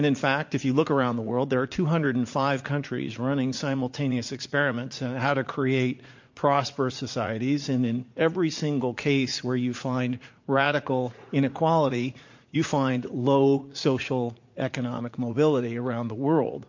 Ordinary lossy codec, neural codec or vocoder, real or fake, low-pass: MP3, 48 kbps; vocoder, 44.1 kHz, 80 mel bands, Vocos; fake; 7.2 kHz